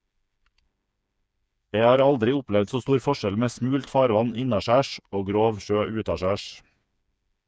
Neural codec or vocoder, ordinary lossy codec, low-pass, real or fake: codec, 16 kHz, 4 kbps, FreqCodec, smaller model; none; none; fake